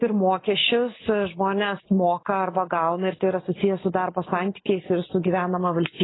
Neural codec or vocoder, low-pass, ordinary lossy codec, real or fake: none; 7.2 kHz; AAC, 16 kbps; real